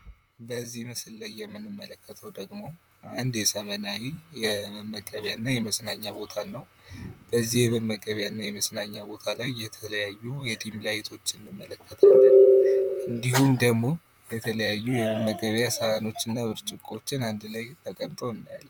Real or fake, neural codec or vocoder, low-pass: fake; vocoder, 44.1 kHz, 128 mel bands, Pupu-Vocoder; 19.8 kHz